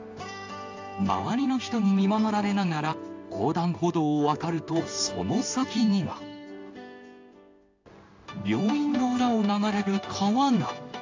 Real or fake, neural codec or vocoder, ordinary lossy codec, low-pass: fake; codec, 16 kHz in and 24 kHz out, 1 kbps, XY-Tokenizer; AAC, 48 kbps; 7.2 kHz